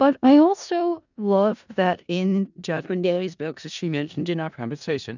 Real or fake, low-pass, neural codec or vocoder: fake; 7.2 kHz; codec, 16 kHz in and 24 kHz out, 0.4 kbps, LongCat-Audio-Codec, four codebook decoder